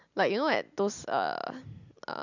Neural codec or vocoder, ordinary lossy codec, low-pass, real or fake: none; none; 7.2 kHz; real